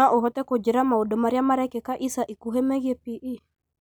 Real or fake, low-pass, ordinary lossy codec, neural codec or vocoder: real; none; none; none